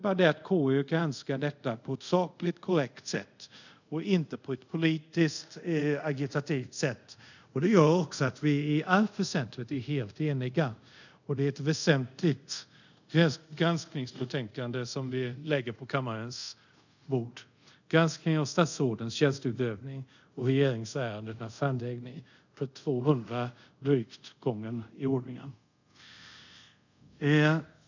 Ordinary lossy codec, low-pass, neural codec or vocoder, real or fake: none; 7.2 kHz; codec, 24 kHz, 0.5 kbps, DualCodec; fake